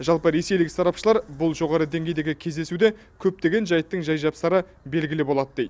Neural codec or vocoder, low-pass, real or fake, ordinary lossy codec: none; none; real; none